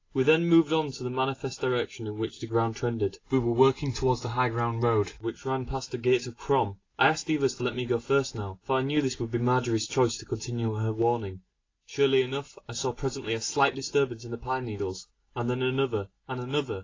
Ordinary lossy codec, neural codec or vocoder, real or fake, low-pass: AAC, 32 kbps; none; real; 7.2 kHz